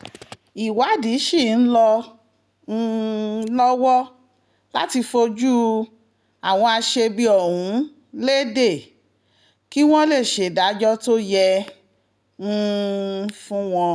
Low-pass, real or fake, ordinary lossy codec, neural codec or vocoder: none; real; none; none